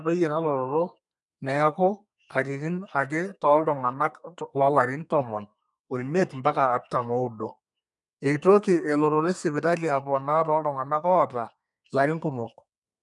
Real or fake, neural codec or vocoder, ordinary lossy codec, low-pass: fake; codec, 32 kHz, 1.9 kbps, SNAC; none; 10.8 kHz